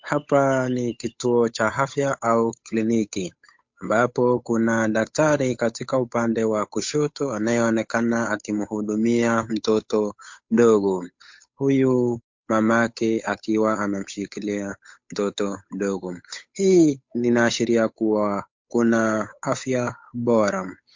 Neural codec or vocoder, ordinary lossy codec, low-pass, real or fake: codec, 16 kHz, 8 kbps, FunCodec, trained on Chinese and English, 25 frames a second; MP3, 48 kbps; 7.2 kHz; fake